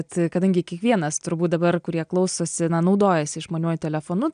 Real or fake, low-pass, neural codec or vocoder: real; 9.9 kHz; none